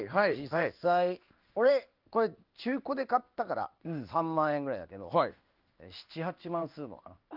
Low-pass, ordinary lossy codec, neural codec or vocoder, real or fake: 5.4 kHz; Opus, 24 kbps; codec, 16 kHz in and 24 kHz out, 1 kbps, XY-Tokenizer; fake